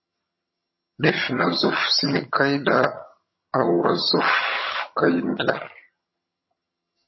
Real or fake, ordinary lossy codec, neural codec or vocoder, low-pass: fake; MP3, 24 kbps; vocoder, 22.05 kHz, 80 mel bands, HiFi-GAN; 7.2 kHz